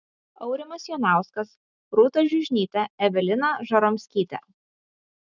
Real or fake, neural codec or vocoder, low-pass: real; none; 7.2 kHz